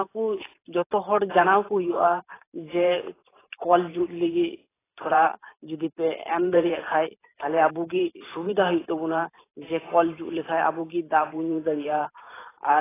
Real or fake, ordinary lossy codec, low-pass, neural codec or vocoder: fake; AAC, 16 kbps; 3.6 kHz; vocoder, 44.1 kHz, 128 mel bands every 512 samples, BigVGAN v2